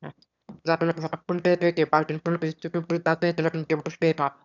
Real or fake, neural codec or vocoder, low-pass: fake; autoencoder, 22.05 kHz, a latent of 192 numbers a frame, VITS, trained on one speaker; 7.2 kHz